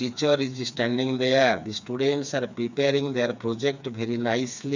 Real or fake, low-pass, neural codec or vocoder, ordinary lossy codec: fake; 7.2 kHz; codec, 16 kHz, 4 kbps, FreqCodec, smaller model; none